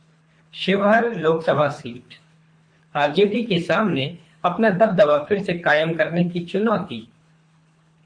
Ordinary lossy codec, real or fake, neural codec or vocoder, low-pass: MP3, 48 kbps; fake; codec, 24 kHz, 3 kbps, HILCodec; 9.9 kHz